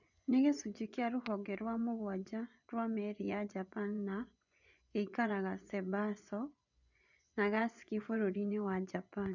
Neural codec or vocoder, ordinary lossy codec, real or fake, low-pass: vocoder, 24 kHz, 100 mel bands, Vocos; none; fake; 7.2 kHz